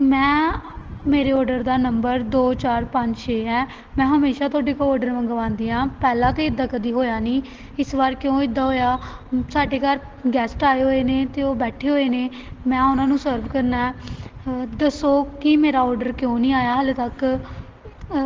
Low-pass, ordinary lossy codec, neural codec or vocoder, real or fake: 7.2 kHz; Opus, 16 kbps; none; real